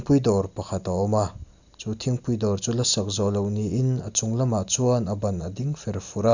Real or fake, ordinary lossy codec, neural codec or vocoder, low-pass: real; none; none; 7.2 kHz